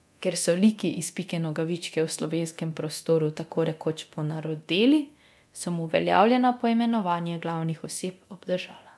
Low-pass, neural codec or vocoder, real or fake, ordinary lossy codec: none; codec, 24 kHz, 0.9 kbps, DualCodec; fake; none